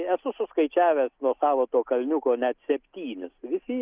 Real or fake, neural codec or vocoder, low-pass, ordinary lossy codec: real; none; 3.6 kHz; Opus, 24 kbps